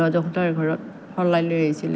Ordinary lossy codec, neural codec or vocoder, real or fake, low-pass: none; none; real; none